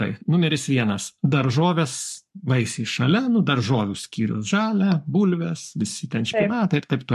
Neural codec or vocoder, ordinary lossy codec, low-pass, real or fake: codec, 44.1 kHz, 7.8 kbps, Pupu-Codec; MP3, 64 kbps; 14.4 kHz; fake